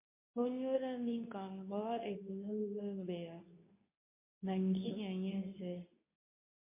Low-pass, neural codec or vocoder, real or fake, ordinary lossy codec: 3.6 kHz; codec, 24 kHz, 0.9 kbps, WavTokenizer, medium speech release version 2; fake; MP3, 24 kbps